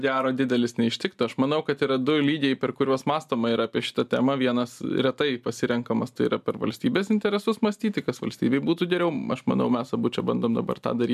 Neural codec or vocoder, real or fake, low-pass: none; real; 14.4 kHz